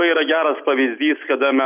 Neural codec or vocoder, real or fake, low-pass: none; real; 3.6 kHz